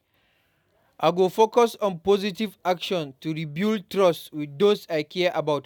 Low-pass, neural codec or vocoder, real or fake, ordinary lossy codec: 19.8 kHz; none; real; none